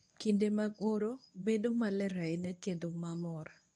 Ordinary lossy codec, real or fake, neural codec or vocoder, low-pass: none; fake; codec, 24 kHz, 0.9 kbps, WavTokenizer, medium speech release version 1; none